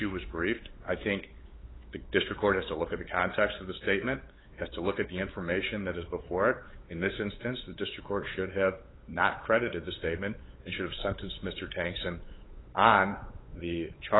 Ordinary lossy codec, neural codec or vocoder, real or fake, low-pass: AAC, 16 kbps; codec, 16 kHz, 8 kbps, FunCodec, trained on LibriTTS, 25 frames a second; fake; 7.2 kHz